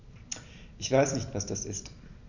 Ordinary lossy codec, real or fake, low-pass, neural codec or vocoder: none; real; 7.2 kHz; none